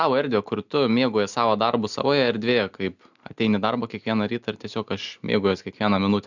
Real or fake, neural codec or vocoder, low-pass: real; none; 7.2 kHz